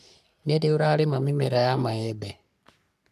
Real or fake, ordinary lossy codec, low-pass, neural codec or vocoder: fake; none; 14.4 kHz; codec, 44.1 kHz, 3.4 kbps, Pupu-Codec